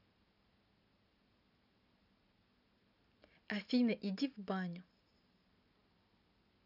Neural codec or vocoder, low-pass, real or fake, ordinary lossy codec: none; 5.4 kHz; real; none